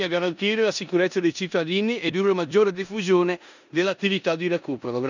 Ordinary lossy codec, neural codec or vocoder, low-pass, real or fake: none; codec, 16 kHz in and 24 kHz out, 0.9 kbps, LongCat-Audio-Codec, four codebook decoder; 7.2 kHz; fake